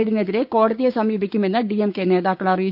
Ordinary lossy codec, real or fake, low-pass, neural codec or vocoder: none; fake; 5.4 kHz; codec, 16 kHz, 6 kbps, DAC